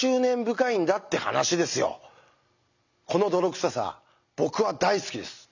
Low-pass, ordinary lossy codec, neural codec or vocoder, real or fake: 7.2 kHz; none; none; real